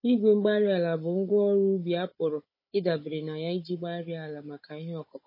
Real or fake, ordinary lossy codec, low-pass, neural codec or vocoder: fake; MP3, 24 kbps; 5.4 kHz; codec, 16 kHz, 16 kbps, FunCodec, trained on Chinese and English, 50 frames a second